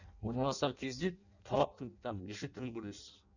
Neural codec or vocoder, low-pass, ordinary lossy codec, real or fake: codec, 16 kHz in and 24 kHz out, 0.6 kbps, FireRedTTS-2 codec; 7.2 kHz; none; fake